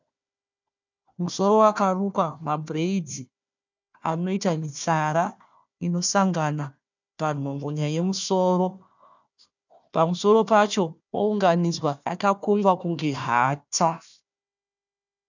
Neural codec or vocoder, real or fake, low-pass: codec, 16 kHz, 1 kbps, FunCodec, trained on Chinese and English, 50 frames a second; fake; 7.2 kHz